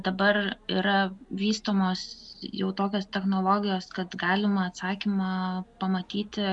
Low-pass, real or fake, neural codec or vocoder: 10.8 kHz; real; none